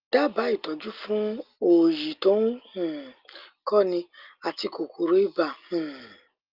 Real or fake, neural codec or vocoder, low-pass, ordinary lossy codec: real; none; 5.4 kHz; Opus, 32 kbps